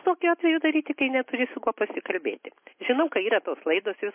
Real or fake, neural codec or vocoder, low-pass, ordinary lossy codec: real; none; 3.6 kHz; MP3, 24 kbps